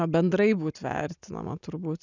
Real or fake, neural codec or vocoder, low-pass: real; none; 7.2 kHz